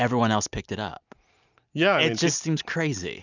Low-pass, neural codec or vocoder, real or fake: 7.2 kHz; none; real